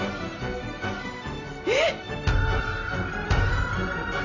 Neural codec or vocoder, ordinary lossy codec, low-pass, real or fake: none; none; 7.2 kHz; real